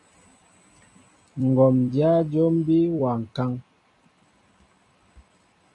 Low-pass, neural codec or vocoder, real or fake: 10.8 kHz; vocoder, 44.1 kHz, 128 mel bands every 256 samples, BigVGAN v2; fake